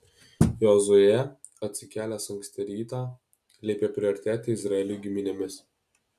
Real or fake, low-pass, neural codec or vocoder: real; 14.4 kHz; none